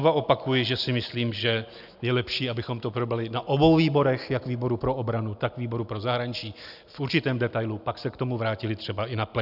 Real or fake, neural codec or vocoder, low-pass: real; none; 5.4 kHz